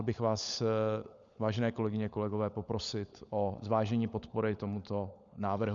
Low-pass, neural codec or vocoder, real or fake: 7.2 kHz; codec, 16 kHz, 16 kbps, FunCodec, trained on LibriTTS, 50 frames a second; fake